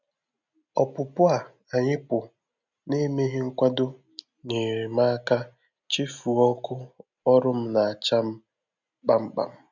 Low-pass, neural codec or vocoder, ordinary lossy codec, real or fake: 7.2 kHz; none; none; real